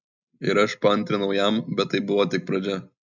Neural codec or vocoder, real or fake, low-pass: none; real; 7.2 kHz